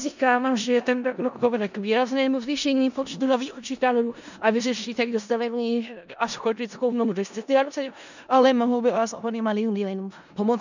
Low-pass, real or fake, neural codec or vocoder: 7.2 kHz; fake; codec, 16 kHz in and 24 kHz out, 0.4 kbps, LongCat-Audio-Codec, four codebook decoder